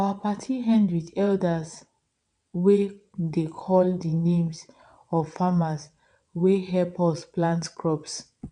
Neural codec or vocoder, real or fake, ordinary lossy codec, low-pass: vocoder, 22.05 kHz, 80 mel bands, WaveNeXt; fake; none; 9.9 kHz